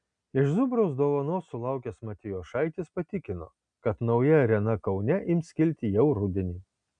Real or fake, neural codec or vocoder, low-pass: real; none; 9.9 kHz